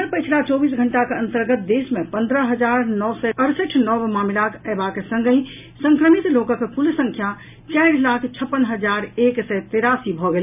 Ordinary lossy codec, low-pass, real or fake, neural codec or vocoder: none; 3.6 kHz; real; none